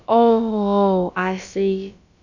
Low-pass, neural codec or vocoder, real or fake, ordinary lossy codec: 7.2 kHz; codec, 16 kHz, about 1 kbps, DyCAST, with the encoder's durations; fake; none